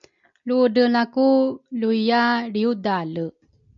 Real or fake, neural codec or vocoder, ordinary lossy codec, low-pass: real; none; MP3, 64 kbps; 7.2 kHz